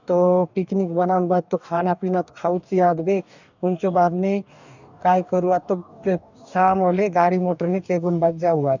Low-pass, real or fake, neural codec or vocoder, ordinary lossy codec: 7.2 kHz; fake; codec, 44.1 kHz, 2.6 kbps, DAC; none